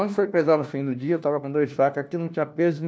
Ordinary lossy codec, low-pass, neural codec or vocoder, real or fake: none; none; codec, 16 kHz, 1 kbps, FunCodec, trained on LibriTTS, 50 frames a second; fake